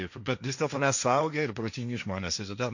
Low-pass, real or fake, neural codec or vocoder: 7.2 kHz; fake; codec, 16 kHz, 1.1 kbps, Voila-Tokenizer